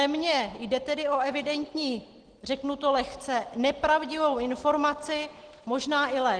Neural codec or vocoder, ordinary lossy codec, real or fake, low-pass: none; Opus, 16 kbps; real; 9.9 kHz